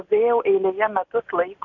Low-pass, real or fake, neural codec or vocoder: 7.2 kHz; real; none